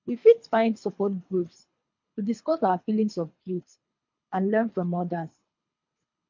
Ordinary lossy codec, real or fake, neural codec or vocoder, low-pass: MP3, 48 kbps; fake; codec, 24 kHz, 3 kbps, HILCodec; 7.2 kHz